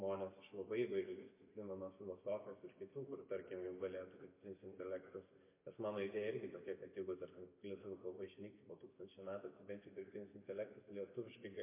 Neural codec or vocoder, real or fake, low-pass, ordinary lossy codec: none; real; 3.6 kHz; MP3, 24 kbps